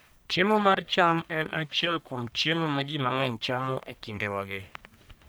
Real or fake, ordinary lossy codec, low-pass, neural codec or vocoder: fake; none; none; codec, 44.1 kHz, 1.7 kbps, Pupu-Codec